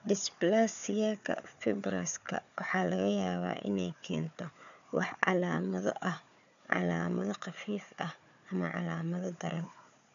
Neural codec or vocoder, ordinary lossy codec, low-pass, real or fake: codec, 16 kHz, 4 kbps, FunCodec, trained on Chinese and English, 50 frames a second; none; 7.2 kHz; fake